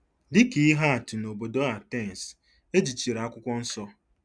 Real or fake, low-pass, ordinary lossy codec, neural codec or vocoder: real; 9.9 kHz; none; none